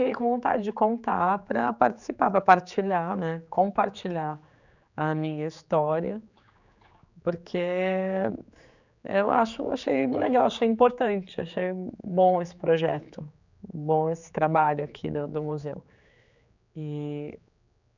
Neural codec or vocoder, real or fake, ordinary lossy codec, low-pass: codec, 16 kHz, 4 kbps, X-Codec, HuBERT features, trained on general audio; fake; none; 7.2 kHz